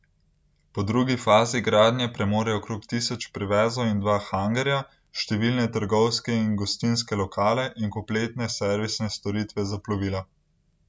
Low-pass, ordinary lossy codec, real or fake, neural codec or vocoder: none; none; real; none